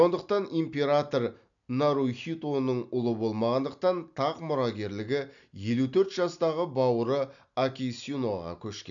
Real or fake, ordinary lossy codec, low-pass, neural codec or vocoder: real; none; 7.2 kHz; none